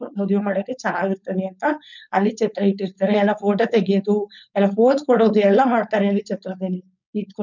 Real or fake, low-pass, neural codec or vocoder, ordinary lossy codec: fake; 7.2 kHz; codec, 16 kHz, 4.8 kbps, FACodec; none